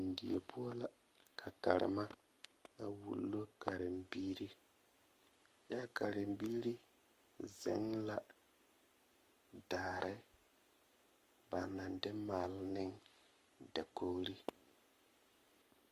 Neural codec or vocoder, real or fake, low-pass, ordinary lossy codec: codec, 44.1 kHz, 7.8 kbps, Pupu-Codec; fake; 14.4 kHz; Opus, 32 kbps